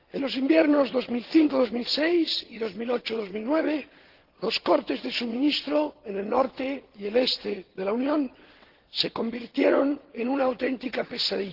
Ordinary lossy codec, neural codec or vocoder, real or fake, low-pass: Opus, 16 kbps; vocoder, 22.05 kHz, 80 mel bands, WaveNeXt; fake; 5.4 kHz